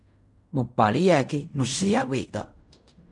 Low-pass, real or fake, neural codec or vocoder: 10.8 kHz; fake; codec, 16 kHz in and 24 kHz out, 0.4 kbps, LongCat-Audio-Codec, fine tuned four codebook decoder